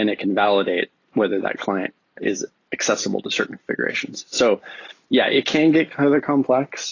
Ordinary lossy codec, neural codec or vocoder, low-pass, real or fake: AAC, 32 kbps; none; 7.2 kHz; real